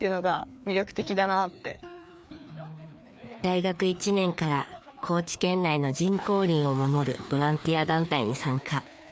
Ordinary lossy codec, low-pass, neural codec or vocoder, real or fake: none; none; codec, 16 kHz, 2 kbps, FreqCodec, larger model; fake